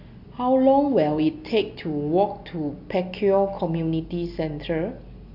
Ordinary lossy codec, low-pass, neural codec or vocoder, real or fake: none; 5.4 kHz; none; real